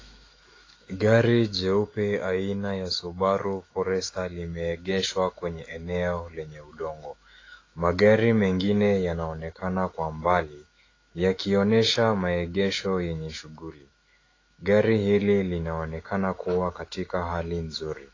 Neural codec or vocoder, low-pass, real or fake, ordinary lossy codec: autoencoder, 48 kHz, 128 numbers a frame, DAC-VAE, trained on Japanese speech; 7.2 kHz; fake; AAC, 32 kbps